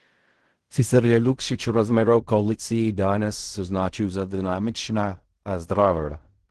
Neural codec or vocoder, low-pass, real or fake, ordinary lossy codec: codec, 16 kHz in and 24 kHz out, 0.4 kbps, LongCat-Audio-Codec, fine tuned four codebook decoder; 10.8 kHz; fake; Opus, 16 kbps